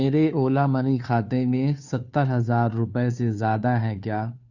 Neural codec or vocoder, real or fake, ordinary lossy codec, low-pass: codec, 16 kHz, 2 kbps, FunCodec, trained on Chinese and English, 25 frames a second; fake; none; 7.2 kHz